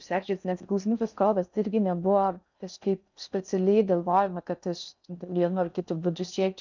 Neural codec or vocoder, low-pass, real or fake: codec, 16 kHz in and 24 kHz out, 0.6 kbps, FocalCodec, streaming, 4096 codes; 7.2 kHz; fake